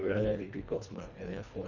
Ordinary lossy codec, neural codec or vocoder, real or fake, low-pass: none; codec, 24 kHz, 1.5 kbps, HILCodec; fake; 7.2 kHz